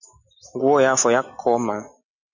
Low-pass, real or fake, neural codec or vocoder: 7.2 kHz; real; none